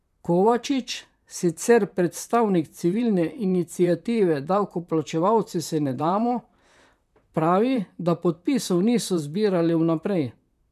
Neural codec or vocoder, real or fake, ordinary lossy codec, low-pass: vocoder, 44.1 kHz, 128 mel bands, Pupu-Vocoder; fake; none; 14.4 kHz